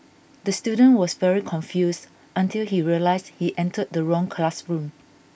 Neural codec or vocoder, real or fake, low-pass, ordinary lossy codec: none; real; none; none